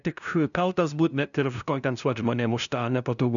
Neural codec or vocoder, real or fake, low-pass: codec, 16 kHz, 0.5 kbps, FunCodec, trained on LibriTTS, 25 frames a second; fake; 7.2 kHz